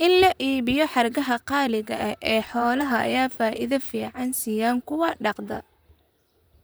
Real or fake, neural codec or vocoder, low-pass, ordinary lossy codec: fake; vocoder, 44.1 kHz, 128 mel bands, Pupu-Vocoder; none; none